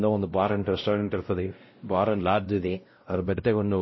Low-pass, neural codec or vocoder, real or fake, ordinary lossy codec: 7.2 kHz; codec, 16 kHz, 0.5 kbps, X-Codec, WavLM features, trained on Multilingual LibriSpeech; fake; MP3, 24 kbps